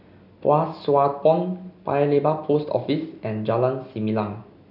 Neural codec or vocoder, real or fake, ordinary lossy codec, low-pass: none; real; none; 5.4 kHz